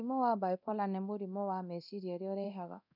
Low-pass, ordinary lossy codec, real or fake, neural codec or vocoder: 5.4 kHz; none; fake; codec, 24 kHz, 0.9 kbps, DualCodec